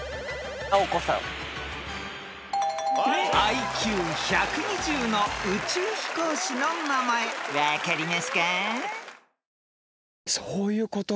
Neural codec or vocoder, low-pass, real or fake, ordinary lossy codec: none; none; real; none